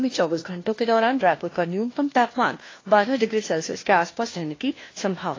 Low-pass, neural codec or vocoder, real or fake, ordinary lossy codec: 7.2 kHz; codec, 16 kHz, 1 kbps, FunCodec, trained on LibriTTS, 50 frames a second; fake; AAC, 32 kbps